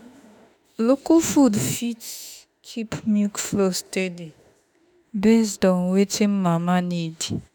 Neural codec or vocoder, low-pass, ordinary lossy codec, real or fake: autoencoder, 48 kHz, 32 numbers a frame, DAC-VAE, trained on Japanese speech; none; none; fake